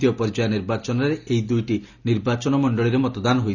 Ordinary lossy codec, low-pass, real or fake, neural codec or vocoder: none; 7.2 kHz; real; none